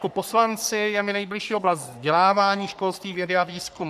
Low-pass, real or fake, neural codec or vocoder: 14.4 kHz; fake; codec, 44.1 kHz, 3.4 kbps, Pupu-Codec